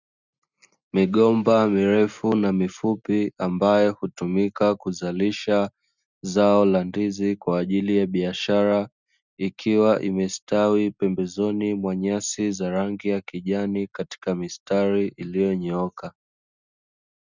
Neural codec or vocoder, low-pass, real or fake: none; 7.2 kHz; real